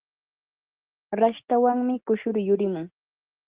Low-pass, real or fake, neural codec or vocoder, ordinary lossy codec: 3.6 kHz; real; none; Opus, 16 kbps